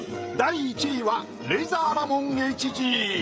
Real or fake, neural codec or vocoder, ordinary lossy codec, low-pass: fake; codec, 16 kHz, 16 kbps, FreqCodec, smaller model; none; none